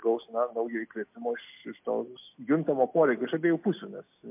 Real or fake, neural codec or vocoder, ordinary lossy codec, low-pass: real; none; MP3, 32 kbps; 3.6 kHz